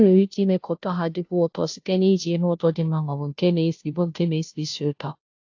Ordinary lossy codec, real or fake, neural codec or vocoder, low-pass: AAC, 48 kbps; fake; codec, 16 kHz, 0.5 kbps, FunCodec, trained on Chinese and English, 25 frames a second; 7.2 kHz